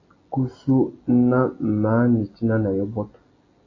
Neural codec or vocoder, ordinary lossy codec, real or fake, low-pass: none; AAC, 32 kbps; real; 7.2 kHz